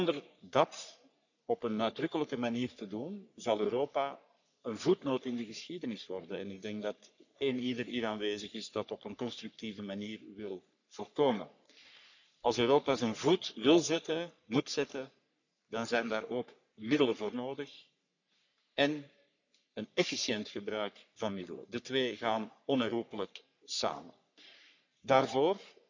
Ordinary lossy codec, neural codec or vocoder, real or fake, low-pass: none; codec, 44.1 kHz, 3.4 kbps, Pupu-Codec; fake; 7.2 kHz